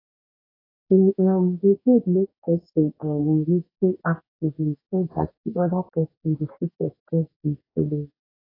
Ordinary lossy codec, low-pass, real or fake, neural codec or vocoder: AAC, 24 kbps; 5.4 kHz; fake; codec, 44.1 kHz, 2.6 kbps, DAC